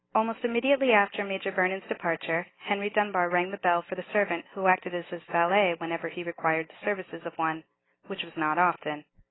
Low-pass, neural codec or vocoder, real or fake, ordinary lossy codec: 7.2 kHz; none; real; AAC, 16 kbps